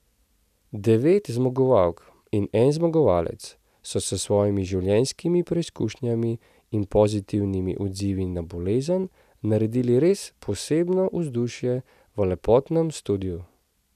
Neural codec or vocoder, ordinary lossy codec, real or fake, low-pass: none; none; real; 14.4 kHz